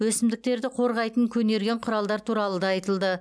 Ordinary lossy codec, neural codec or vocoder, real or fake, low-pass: none; none; real; none